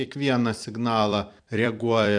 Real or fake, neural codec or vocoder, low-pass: fake; vocoder, 44.1 kHz, 128 mel bands every 256 samples, BigVGAN v2; 9.9 kHz